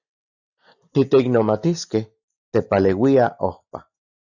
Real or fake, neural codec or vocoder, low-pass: real; none; 7.2 kHz